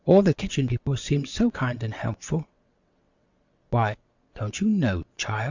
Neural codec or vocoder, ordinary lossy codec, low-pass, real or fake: vocoder, 22.05 kHz, 80 mel bands, WaveNeXt; Opus, 64 kbps; 7.2 kHz; fake